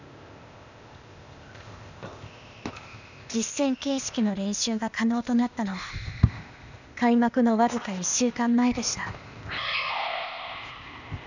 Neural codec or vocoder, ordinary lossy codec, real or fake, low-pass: codec, 16 kHz, 0.8 kbps, ZipCodec; none; fake; 7.2 kHz